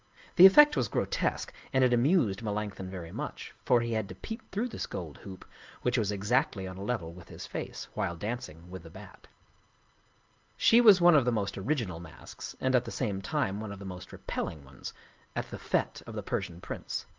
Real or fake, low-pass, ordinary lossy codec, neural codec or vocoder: real; 7.2 kHz; Opus, 32 kbps; none